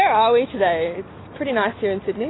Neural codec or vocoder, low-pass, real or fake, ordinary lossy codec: none; 7.2 kHz; real; AAC, 16 kbps